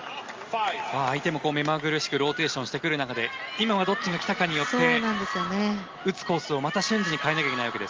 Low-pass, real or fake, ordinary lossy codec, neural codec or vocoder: 7.2 kHz; real; Opus, 32 kbps; none